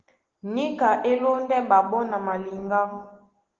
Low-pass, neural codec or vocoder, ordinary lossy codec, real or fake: 7.2 kHz; none; Opus, 16 kbps; real